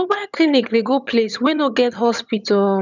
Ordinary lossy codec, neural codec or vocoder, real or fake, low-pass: none; vocoder, 22.05 kHz, 80 mel bands, HiFi-GAN; fake; 7.2 kHz